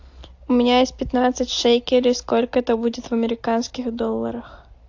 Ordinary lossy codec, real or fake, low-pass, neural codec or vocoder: AAC, 48 kbps; real; 7.2 kHz; none